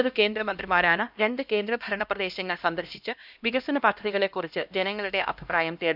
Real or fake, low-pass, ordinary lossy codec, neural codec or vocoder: fake; 5.4 kHz; none; codec, 16 kHz, 1 kbps, X-Codec, HuBERT features, trained on LibriSpeech